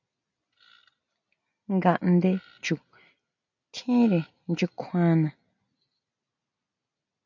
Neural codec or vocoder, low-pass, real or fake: none; 7.2 kHz; real